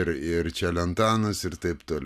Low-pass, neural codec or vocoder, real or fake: 14.4 kHz; none; real